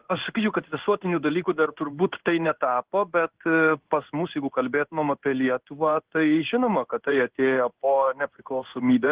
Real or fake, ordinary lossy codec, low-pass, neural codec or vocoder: fake; Opus, 16 kbps; 3.6 kHz; codec, 16 kHz in and 24 kHz out, 1 kbps, XY-Tokenizer